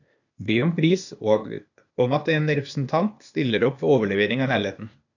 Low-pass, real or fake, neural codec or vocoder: 7.2 kHz; fake; codec, 16 kHz, 0.8 kbps, ZipCodec